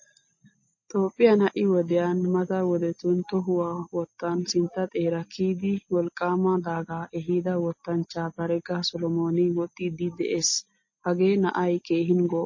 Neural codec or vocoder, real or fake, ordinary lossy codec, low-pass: none; real; MP3, 32 kbps; 7.2 kHz